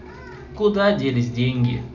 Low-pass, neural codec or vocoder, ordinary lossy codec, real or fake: 7.2 kHz; none; none; real